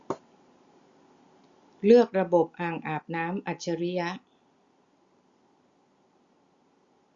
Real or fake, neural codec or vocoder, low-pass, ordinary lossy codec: real; none; 7.2 kHz; Opus, 64 kbps